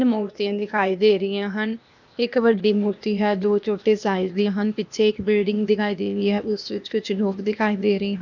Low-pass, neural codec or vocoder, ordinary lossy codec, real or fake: 7.2 kHz; codec, 16 kHz, 0.8 kbps, ZipCodec; none; fake